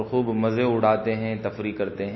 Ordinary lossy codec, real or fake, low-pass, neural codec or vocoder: MP3, 24 kbps; real; 7.2 kHz; none